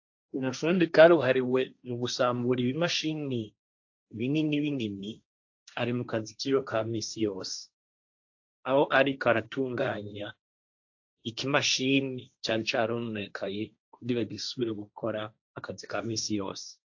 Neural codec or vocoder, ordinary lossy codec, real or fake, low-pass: codec, 16 kHz, 1.1 kbps, Voila-Tokenizer; AAC, 48 kbps; fake; 7.2 kHz